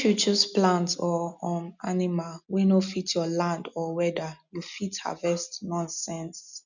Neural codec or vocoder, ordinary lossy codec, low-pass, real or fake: none; none; 7.2 kHz; real